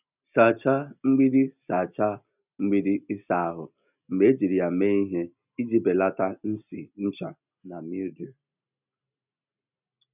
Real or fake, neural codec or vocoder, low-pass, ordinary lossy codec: real; none; 3.6 kHz; none